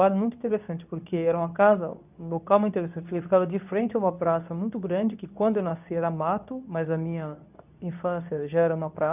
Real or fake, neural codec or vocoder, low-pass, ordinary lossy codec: fake; codec, 24 kHz, 0.9 kbps, WavTokenizer, medium speech release version 2; 3.6 kHz; none